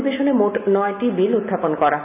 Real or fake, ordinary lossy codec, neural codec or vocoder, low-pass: real; none; none; 3.6 kHz